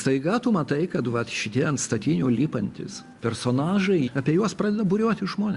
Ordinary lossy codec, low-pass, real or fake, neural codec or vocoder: Opus, 64 kbps; 10.8 kHz; real; none